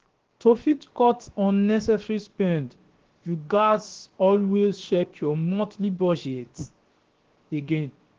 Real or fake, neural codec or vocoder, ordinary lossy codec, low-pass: fake; codec, 16 kHz, 0.7 kbps, FocalCodec; Opus, 16 kbps; 7.2 kHz